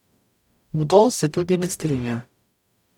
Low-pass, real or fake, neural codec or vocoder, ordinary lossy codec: 19.8 kHz; fake; codec, 44.1 kHz, 0.9 kbps, DAC; none